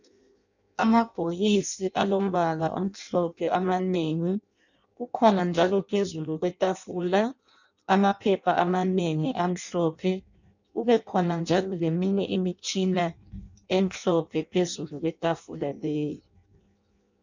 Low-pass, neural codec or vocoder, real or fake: 7.2 kHz; codec, 16 kHz in and 24 kHz out, 0.6 kbps, FireRedTTS-2 codec; fake